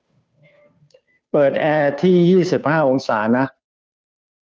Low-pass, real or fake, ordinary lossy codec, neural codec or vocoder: none; fake; none; codec, 16 kHz, 2 kbps, FunCodec, trained on Chinese and English, 25 frames a second